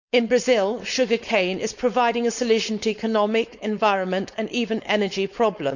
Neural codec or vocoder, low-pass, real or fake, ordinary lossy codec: codec, 16 kHz, 4.8 kbps, FACodec; 7.2 kHz; fake; none